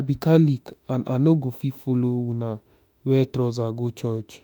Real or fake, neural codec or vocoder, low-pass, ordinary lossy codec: fake; autoencoder, 48 kHz, 32 numbers a frame, DAC-VAE, trained on Japanese speech; 19.8 kHz; none